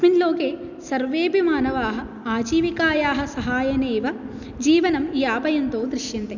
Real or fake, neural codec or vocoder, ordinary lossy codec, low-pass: real; none; none; 7.2 kHz